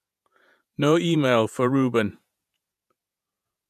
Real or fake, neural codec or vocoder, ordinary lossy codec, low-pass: fake; vocoder, 48 kHz, 128 mel bands, Vocos; none; 14.4 kHz